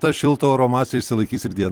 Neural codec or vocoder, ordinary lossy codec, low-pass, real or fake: vocoder, 44.1 kHz, 128 mel bands every 256 samples, BigVGAN v2; Opus, 24 kbps; 19.8 kHz; fake